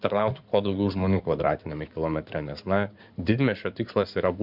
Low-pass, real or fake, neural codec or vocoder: 5.4 kHz; fake; codec, 16 kHz in and 24 kHz out, 2.2 kbps, FireRedTTS-2 codec